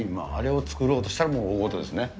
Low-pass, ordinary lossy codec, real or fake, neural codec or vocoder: none; none; real; none